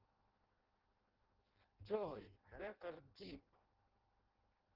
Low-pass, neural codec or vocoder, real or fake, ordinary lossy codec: 5.4 kHz; codec, 16 kHz in and 24 kHz out, 0.6 kbps, FireRedTTS-2 codec; fake; Opus, 16 kbps